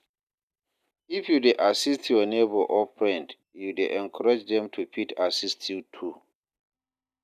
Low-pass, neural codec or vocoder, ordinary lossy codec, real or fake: 14.4 kHz; none; none; real